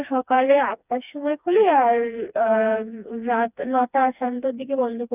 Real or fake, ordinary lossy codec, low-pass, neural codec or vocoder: fake; none; 3.6 kHz; codec, 16 kHz, 2 kbps, FreqCodec, smaller model